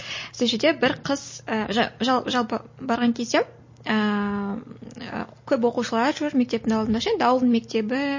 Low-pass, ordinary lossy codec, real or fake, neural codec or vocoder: 7.2 kHz; MP3, 32 kbps; real; none